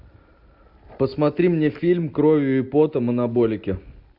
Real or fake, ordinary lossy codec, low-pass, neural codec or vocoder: real; AAC, 48 kbps; 5.4 kHz; none